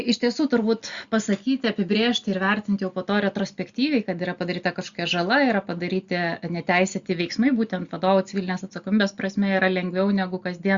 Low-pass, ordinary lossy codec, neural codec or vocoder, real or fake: 7.2 kHz; Opus, 64 kbps; none; real